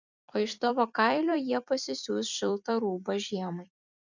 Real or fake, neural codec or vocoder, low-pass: fake; vocoder, 44.1 kHz, 128 mel bands every 256 samples, BigVGAN v2; 7.2 kHz